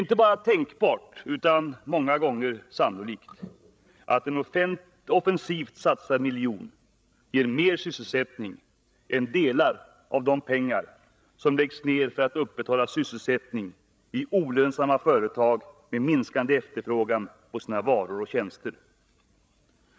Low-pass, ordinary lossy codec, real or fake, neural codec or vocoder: none; none; fake; codec, 16 kHz, 16 kbps, FreqCodec, larger model